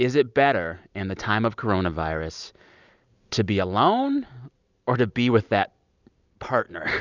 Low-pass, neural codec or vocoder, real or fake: 7.2 kHz; none; real